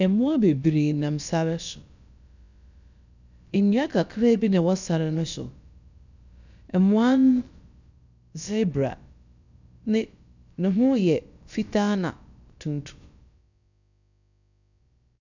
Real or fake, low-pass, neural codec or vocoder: fake; 7.2 kHz; codec, 16 kHz, about 1 kbps, DyCAST, with the encoder's durations